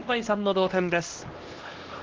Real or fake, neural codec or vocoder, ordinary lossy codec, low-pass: fake; codec, 16 kHz, 1 kbps, X-Codec, HuBERT features, trained on LibriSpeech; Opus, 16 kbps; 7.2 kHz